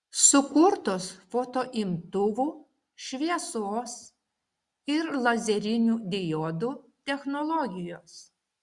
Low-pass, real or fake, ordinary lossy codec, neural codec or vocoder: 10.8 kHz; real; Opus, 64 kbps; none